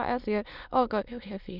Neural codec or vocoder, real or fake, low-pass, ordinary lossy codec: autoencoder, 22.05 kHz, a latent of 192 numbers a frame, VITS, trained on many speakers; fake; 5.4 kHz; none